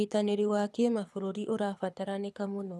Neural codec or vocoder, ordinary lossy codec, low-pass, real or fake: codec, 24 kHz, 6 kbps, HILCodec; none; none; fake